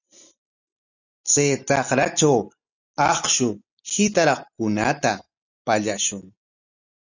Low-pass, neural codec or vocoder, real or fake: 7.2 kHz; none; real